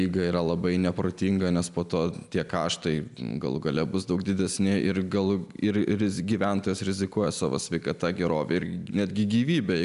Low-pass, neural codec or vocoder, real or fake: 10.8 kHz; none; real